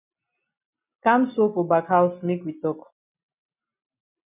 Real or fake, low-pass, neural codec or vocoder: real; 3.6 kHz; none